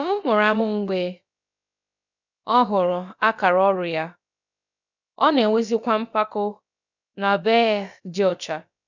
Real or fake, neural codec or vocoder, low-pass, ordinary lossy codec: fake; codec, 16 kHz, about 1 kbps, DyCAST, with the encoder's durations; 7.2 kHz; none